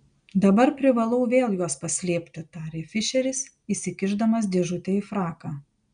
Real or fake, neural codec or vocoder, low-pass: real; none; 9.9 kHz